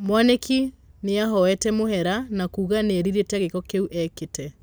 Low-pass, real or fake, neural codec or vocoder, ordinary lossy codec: none; real; none; none